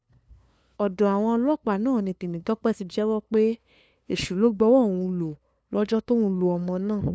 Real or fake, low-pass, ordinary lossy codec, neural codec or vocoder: fake; none; none; codec, 16 kHz, 2 kbps, FunCodec, trained on LibriTTS, 25 frames a second